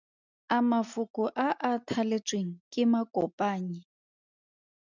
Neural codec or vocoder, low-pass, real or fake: none; 7.2 kHz; real